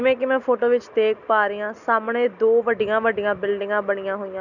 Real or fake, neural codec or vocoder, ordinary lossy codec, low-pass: real; none; none; 7.2 kHz